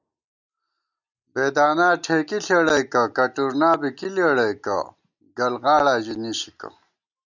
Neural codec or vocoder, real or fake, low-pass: none; real; 7.2 kHz